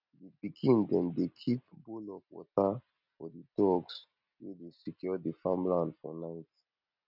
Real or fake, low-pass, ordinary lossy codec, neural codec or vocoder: real; 5.4 kHz; none; none